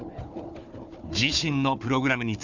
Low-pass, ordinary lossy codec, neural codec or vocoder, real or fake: 7.2 kHz; none; codec, 16 kHz, 4 kbps, FunCodec, trained on Chinese and English, 50 frames a second; fake